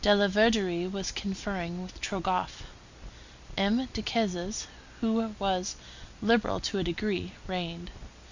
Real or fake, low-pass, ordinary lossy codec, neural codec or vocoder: real; 7.2 kHz; Opus, 64 kbps; none